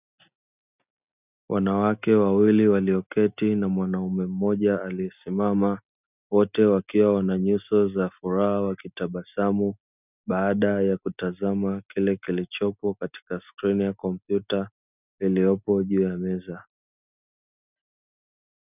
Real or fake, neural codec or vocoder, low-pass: real; none; 3.6 kHz